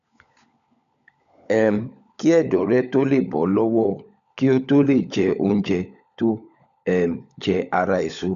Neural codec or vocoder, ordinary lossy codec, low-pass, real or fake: codec, 16 kHz, 16 kbps, FunCodec, trained on LibriTTS, 50 frames a second; none; 7.2 kHz; fake